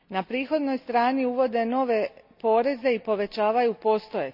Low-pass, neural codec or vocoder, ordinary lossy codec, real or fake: 5.4 kHz; none; none; real